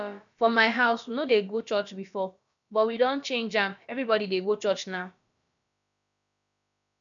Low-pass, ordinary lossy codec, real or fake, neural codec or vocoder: 7.2 kHz; none; fake; codec, 16 kHz, about 1 kbps, DyCAST, with the encoder's durations